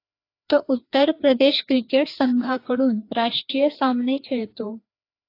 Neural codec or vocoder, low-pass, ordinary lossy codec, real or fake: codec, 16 kHz, 2 kbps, FreqCodec, larger model; 5.4 kHz; AAC, 32 kbps; fake